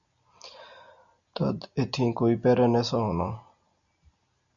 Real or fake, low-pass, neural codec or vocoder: real; 7.2 kHz; none